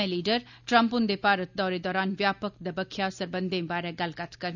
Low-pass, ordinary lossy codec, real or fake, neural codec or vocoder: 7.2 kHz; none; real; none